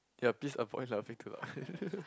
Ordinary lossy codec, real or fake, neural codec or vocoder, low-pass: none; real; none; none